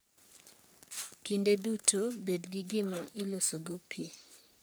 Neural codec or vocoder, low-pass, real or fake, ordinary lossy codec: codec, 44.1 kHz, 3.4 kbps, Pupu-Codec; none; fake; none